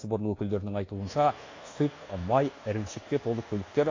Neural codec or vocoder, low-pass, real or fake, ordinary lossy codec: codec, 24 kHz, 1.2 kbps, DualCodec; 7.2 kHz; fake; AAC, 32 kbps